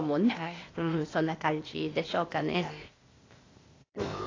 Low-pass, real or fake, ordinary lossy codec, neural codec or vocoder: 7.2 kHz; fake; AAC, 32 kbps; codec, 16 kHz, 0.8 kbps, ZipCodec